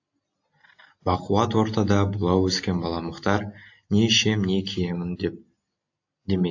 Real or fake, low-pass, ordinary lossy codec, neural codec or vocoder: real; 7.2 kHz; AAC, 48 kbps; none